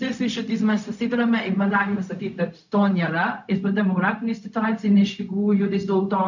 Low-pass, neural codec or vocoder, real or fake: 7.2 kHz; codec, 16 kHz, 0.4 kbps, LongCat-Audio-Codec; fake